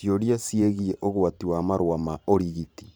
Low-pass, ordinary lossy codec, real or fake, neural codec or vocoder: none; none; fake; vocoder, 44.1 kHz, 128 mel bands every 512 samples, BigVGAN v2